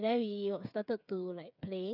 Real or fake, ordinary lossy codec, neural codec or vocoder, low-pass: fake; none; codec, 16 kHz, 8 kbps, FreqCodec, smaller model; 5.4 kHz